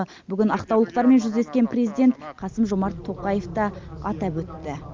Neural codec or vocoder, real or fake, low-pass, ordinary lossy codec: none; real; 7.2 kHz; Opus, 32 kbps